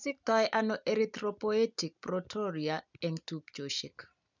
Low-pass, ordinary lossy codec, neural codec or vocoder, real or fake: 7.2 kHz; none; none; real